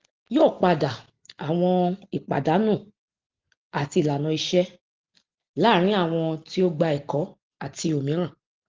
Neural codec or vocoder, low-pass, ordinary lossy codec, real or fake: none; 7.2 kHz; Opus, 16 kbps; real